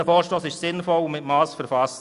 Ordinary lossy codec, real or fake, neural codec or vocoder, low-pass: none; real; none; 10.8 kHz